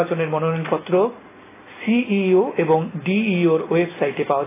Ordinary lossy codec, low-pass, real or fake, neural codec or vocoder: AAC, 16 kbps; 3.6 kHz; real; none